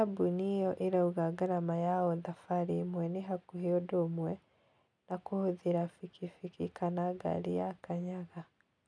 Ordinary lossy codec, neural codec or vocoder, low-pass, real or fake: none; none; 9.9 kHz; real